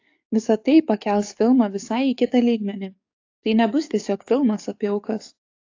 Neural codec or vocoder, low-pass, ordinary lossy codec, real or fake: codec, 44.1 kHz, 7.8 kbps, DAC; 7.2 kHz; AAC, 48 kbps; fake